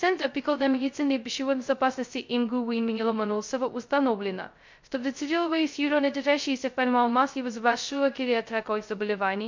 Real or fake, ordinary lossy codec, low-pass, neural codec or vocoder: fake; MP3, 48 kbps; 7.2 kHz; codec, 16 kHz, 0.2 kbps, FocalCodec